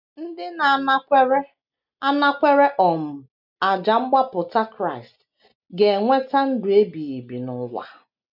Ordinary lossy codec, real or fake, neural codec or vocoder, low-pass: none; real; none; 5.4 kHz